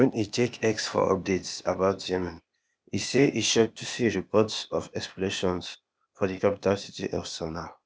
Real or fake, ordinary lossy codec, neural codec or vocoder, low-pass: fake; none; codec, 16 kHz, 0.8 kbps, ZipCodec; none